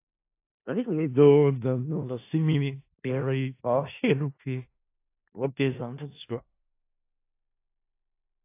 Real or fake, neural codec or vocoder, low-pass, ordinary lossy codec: fake; codec, 16 kHz in and 24 kHz out, 0.4 kbps, LongCat-Audio-Codec, four codebook decoder; 3.6 kHz; AAC, 24 kbps